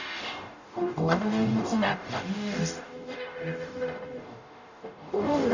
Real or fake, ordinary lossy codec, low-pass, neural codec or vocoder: fake; none; 7.2 kHz; codec, 44.1 kHz, 0.9 kbps, DAC